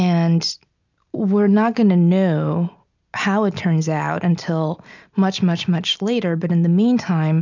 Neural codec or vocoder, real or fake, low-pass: none; real; 7.2 kHz